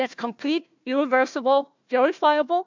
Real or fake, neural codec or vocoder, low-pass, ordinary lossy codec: fake; codec, 16 kHz, 1 kbps, FunCodec, trained on Chinese and English, 50 frames a second; 7.2 kHz; MP3, 64 kbps